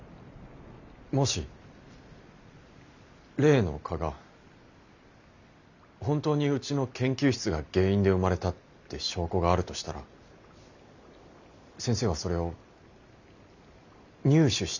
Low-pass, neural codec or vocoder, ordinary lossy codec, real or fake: 7.2 kHz; none; none; real